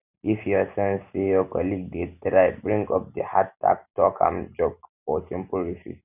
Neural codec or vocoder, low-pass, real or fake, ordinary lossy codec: none; 3.6 kHz; real; none